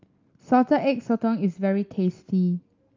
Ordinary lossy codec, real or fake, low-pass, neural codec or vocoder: Opus, 24 kbps; real; 7.2 kHz; none